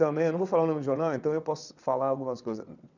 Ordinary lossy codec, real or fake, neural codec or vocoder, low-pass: none; fake; vocoder, 22.05 kHz, 80 mel bands, WaveNeXt; 7.2 kHz